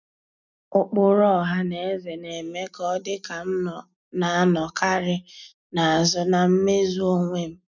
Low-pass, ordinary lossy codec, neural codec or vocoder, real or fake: 7.2 kHz; none; none; real